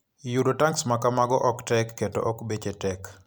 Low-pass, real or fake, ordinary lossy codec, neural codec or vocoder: none; real; none; none